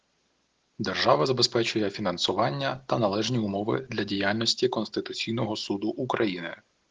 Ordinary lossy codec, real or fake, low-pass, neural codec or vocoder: Opus, 16 kbps; real; 7.2 kHz; none